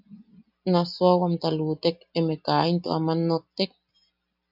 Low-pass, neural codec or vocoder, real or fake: 5.4 kHz; none; real